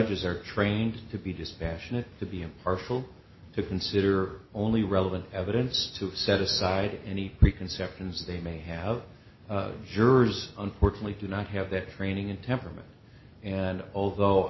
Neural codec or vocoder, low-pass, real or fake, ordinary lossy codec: none; 7.2 kHz; real; MP3, 24 kbps